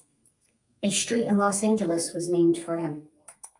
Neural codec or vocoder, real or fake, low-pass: codec, 32 kHz, 1.9 kbps, SNAC; fake; 10.8 kHz